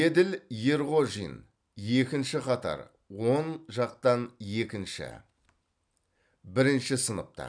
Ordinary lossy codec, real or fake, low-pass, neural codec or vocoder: none; real; 9.9 kHz; none